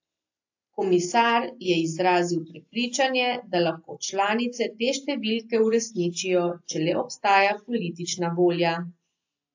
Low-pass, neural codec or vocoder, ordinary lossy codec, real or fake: 7.2 kHz; none; AAC, 48 kbps; real